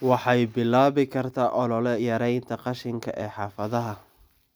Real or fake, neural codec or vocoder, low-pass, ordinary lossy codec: real; none; none; none